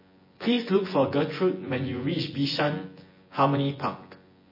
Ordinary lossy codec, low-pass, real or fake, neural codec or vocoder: MP3, 24 kbps; 5.4 kHz; fake; vocoder, 24 kHz, 100 mel bands, Vocos